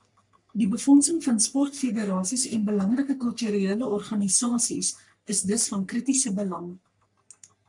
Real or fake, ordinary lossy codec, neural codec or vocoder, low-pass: fake; MP3, 96 kbps; codec, 44.1 kHz, 3.4 kbps, Pupu-Codec; 10.8 kHz